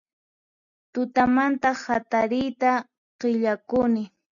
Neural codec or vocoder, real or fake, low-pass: none; real; 7.2 kHz